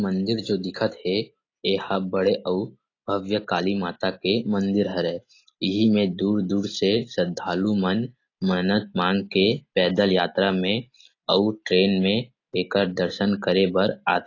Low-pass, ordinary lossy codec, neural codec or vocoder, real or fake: 7.2 kHz; AAC, 32 kbps; none; real